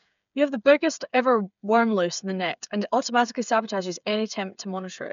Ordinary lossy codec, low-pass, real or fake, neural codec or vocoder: none; 7.2 kHz; fake; codec, 16 kHz, 8 kbps, FreqCodec, smaller model